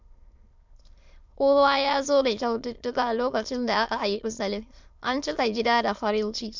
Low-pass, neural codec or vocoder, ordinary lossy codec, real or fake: 7.2 kHz; autoencoder, 22.05 kHz, a latent of 192 numbers a frame, VITS, trained on many speakers; MP3, 64 kbps; fake